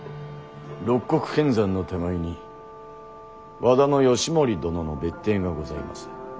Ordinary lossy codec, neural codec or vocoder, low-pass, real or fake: none; none; none; real